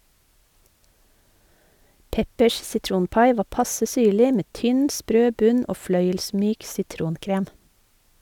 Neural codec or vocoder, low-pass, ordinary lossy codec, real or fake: none; 19.8 kHz; none; real